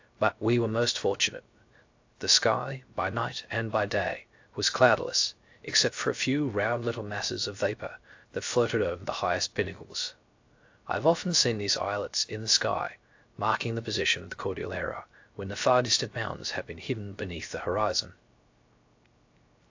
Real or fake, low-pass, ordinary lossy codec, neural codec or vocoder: fake; 7.2 kHz; AAC, 48 kbps; codec, 16 kHz, 0.3 kbps, FocalCodec